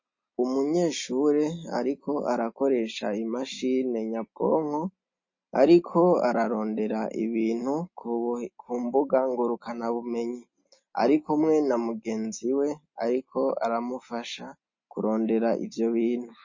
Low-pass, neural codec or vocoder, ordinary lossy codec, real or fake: 7.2 kHz; none; MP3, 32 kbps; real